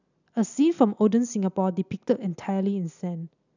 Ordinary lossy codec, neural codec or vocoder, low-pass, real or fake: none; none; 7.2 kHz; real